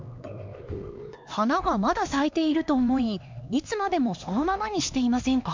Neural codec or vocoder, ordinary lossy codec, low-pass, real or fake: codec, 16 kHz, 2 kbps, X-Codec, HuBERT features, trained on LibriSpeech; MP3, 48 kbps; 7.2 kHz; fake